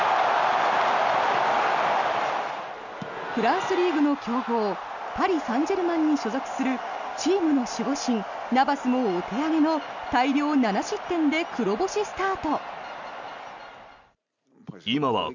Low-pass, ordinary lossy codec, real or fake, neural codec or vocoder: 7.2 kHz; none; real; none